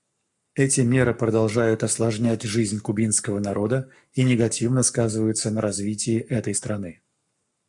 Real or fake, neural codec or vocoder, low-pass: fake; codec, 44.1 kHz, 7.8 kbps, Pupu-Codec; 10.8 kHz